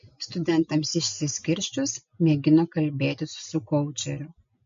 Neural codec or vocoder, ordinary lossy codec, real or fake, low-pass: none; MP3, 48 kbps; real; 7.2 kHz